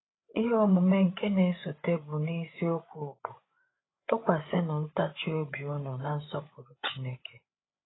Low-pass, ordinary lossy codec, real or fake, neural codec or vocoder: 7.2 kHz; AAC, 16 kbps; fake; codec, 16 kHz, 8 kbps, FreqCodec, larger model